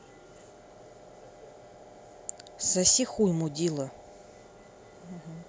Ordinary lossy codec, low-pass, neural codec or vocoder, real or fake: none; none; none; real